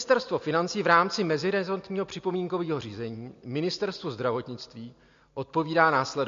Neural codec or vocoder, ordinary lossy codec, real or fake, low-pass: none; MP3, 48 kbps; real; 7.2 kHz